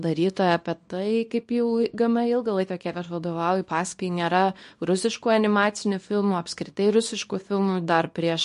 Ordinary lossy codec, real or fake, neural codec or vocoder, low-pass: MP3, 48 kbps; fake; codec, 24 kHz, 0.9 kbps, WavTokenizer, small release; 10.8 kHz